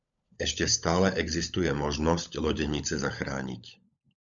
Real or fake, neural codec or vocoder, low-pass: fake; codec, 16 kHz, 16 kbps, FunCodec, trained on LibriTTS, 50 frames a second; 7.2 kHz